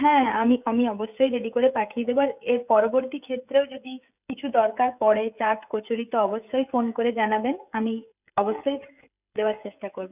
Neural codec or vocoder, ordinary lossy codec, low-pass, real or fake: codec, 16 kHz, 16 kbps, FreqCodec, smaller model; none; 3.6 kHz; fake